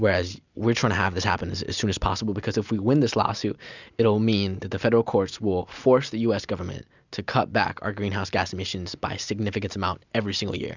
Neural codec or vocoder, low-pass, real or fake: none; 7.2 kHz; real